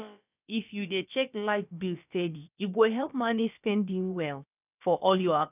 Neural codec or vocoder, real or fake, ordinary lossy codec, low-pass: codec, 16 kHz, about 1 kbps, DyCAST, with the encoder's durations; fake; none; 3.6 kHz